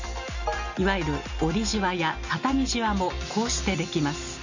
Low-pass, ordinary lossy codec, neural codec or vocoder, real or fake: 7.2 kHz; none; none; real